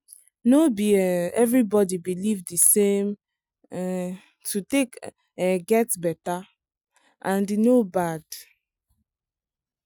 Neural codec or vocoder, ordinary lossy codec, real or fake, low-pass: none; none; real; none